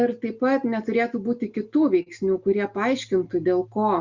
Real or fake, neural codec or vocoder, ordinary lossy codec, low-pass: real; none; Opus, 64 kbps; 7.2 kHz